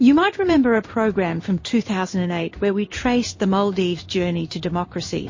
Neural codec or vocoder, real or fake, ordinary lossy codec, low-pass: codec, 16 kHz in and 24 kHz out, 1 kbps, XY-Tokenizer; fake; MP3, 32 kbps; 7.2 kHz